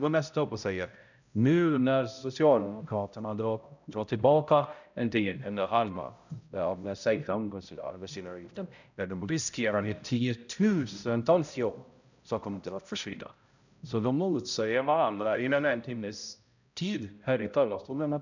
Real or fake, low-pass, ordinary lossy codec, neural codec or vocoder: fake; 7.2 kHz; none; codec, 16 kHz, 0.5 kbps, X-Codec, HuBERT features, trained on balanced general audio